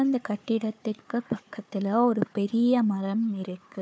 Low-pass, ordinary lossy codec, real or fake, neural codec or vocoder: none; none; fake; codec, 16 kHz, 4 kbps, FunCodec, trained on Chinese and English, 50 frames a second